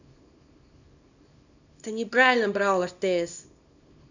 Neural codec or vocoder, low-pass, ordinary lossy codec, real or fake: codec, 24 kHz, 0.9 kbps, WavTokenizer, small release; 7.2 kHz; none; fake